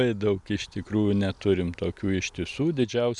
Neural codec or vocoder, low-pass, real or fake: none; 10.8 kHz; real